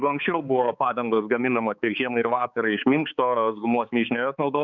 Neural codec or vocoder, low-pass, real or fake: codec, 16 kHz, 4 kbps, X-Codec, HuBERT features, trained on balanced general audio; 7.2 kHz; fake